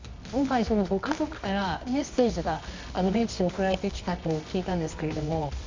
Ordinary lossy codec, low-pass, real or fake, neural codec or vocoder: MP3, 64 kbps; 7.2 kHz; fake; codec, 24 kHz, 0.9 kbps, WavTokenizer, medium music audio release